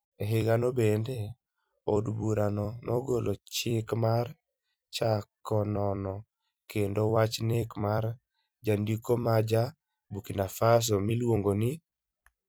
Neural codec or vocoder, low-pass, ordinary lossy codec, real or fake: vocoder, 44.1 kHz, 128 mel bands every 256 samples, BigVGAN v2; none; none; fake